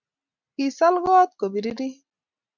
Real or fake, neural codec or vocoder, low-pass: real; none; 7.2 kHz